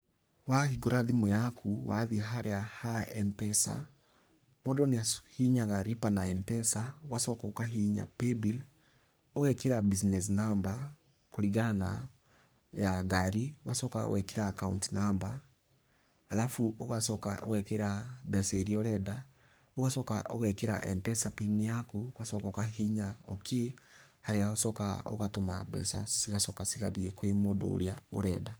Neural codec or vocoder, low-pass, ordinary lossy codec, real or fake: codec, 44.1 kHz, 3.4 kbps, Pupu-Codec; none; none; fake